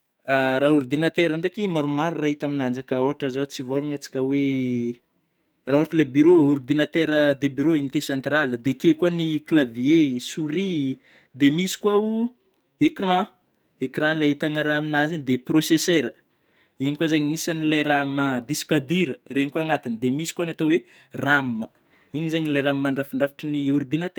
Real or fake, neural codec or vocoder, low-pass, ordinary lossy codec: fake; codec, 44.1 kHz, 2.6 kbps, SNAC; none; none